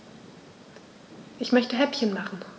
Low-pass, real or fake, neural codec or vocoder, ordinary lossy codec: none; real; none; none